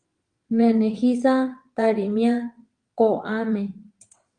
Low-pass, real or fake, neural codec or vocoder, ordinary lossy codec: 9.9 kHz; fake; vocoder, 22.05 kHz, 80 mel bands, WaveNeXt; Opus, 32 kbps